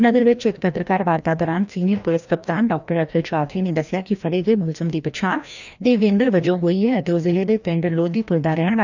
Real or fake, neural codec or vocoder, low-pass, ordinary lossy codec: fake; codec, 16 kHz, 1 kbps, FreqCodec, larger model; 7.2 kHz; none